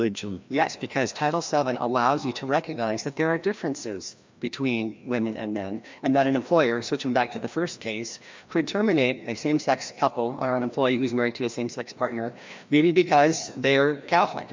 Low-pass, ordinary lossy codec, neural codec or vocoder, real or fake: 7.2 kHz; MP3, 64 kbps; codec, 16 kHz, 1 kbps, FreqCodec, larger model; fake